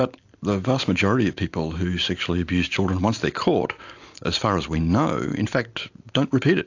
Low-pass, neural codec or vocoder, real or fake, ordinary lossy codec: 7.2 kHz; none; real; AAC, 48 kbps